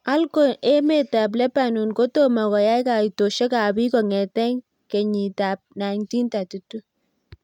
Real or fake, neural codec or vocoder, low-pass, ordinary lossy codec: real; none; 19.8 kHz; none